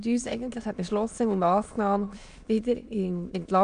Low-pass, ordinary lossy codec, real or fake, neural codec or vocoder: 9.9 kHz; none; fake; autoencoder, 22.05 kHz, a latent of 192 numbers a frame, VITS, trained on many speakers